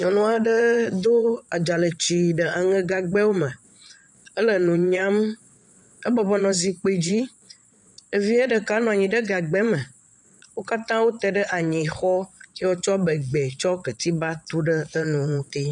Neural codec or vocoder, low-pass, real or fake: vocoder, 22.05 kHz, 80 mel bands, Vocos; 9.9 kHz; fake